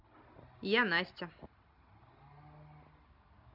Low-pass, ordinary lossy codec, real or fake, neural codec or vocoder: 5.4 kHz; none; real; none